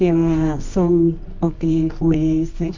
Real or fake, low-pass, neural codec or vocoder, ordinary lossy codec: fake; 7.2 kHz; codec, 24 kHz, 0.9 kbps, WavTokenizer, medium music audio release; MP3, 48 kbps